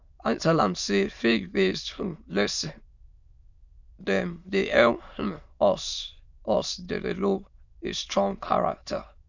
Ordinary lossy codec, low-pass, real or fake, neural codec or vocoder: none; 7.2 kHz; fake; autoencoder, 22.05 kHz, a latent of 192 numbers a frame, VITS, trained on many speakers